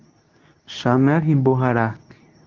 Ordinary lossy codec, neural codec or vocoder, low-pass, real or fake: Opus, 24 kbps; codec, 24 kHz, 0.9 kbps, WavTokenizer, medium speech release version 2; 7.2 kHz; fake